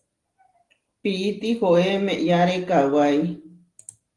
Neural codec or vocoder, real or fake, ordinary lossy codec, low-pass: none; real; Opus, 24 kbps; 10.8 kHz